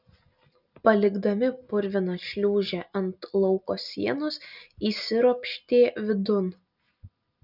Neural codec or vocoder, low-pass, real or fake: none; 5.4 kHz; real